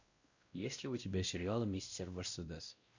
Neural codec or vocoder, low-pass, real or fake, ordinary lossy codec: codec, 16 kHz, 1 kbps, X-Codec, WavLM features, trained on Multilingual LibriSpeech; 7.2 kHz; fake; Opus, 64 kbps